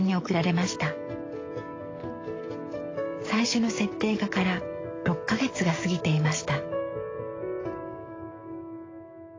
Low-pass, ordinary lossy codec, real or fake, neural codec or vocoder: 7.2 kHz; AAC, 32 kbps; fake; codec, 44.1 kHz, 7.8 kbps, DAC